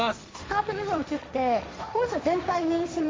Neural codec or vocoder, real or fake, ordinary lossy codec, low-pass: codec, 16 kHz, 1.1 kbps, Voila-Tokenizer; fake; none; none